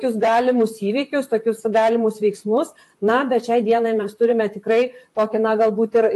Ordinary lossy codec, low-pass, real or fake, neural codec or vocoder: AAC, 64 kbps; 14.4 kHz; fake; vocoder, 44.1 kHz, 128 mel bands, Pupu-Vocoder